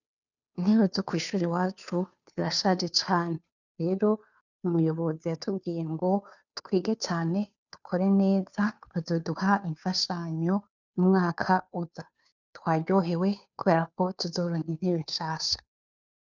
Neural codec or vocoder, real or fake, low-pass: codec, 16 kHz, 2 kbps, FunCodec, trained on Chinese and English, 25 frames a second; fake; 7.2 kHz